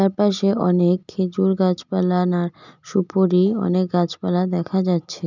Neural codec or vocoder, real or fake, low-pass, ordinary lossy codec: none; real; 7.2 kHz; none